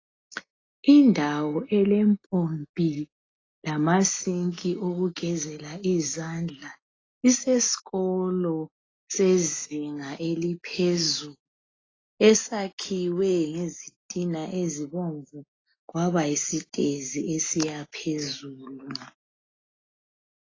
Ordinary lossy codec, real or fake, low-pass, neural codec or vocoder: AAC, 32 kbps; real; 7.2 kHz; none